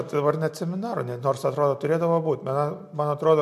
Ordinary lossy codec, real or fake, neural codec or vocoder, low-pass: MP3, 64 kbps; real; none; 14.4 kHz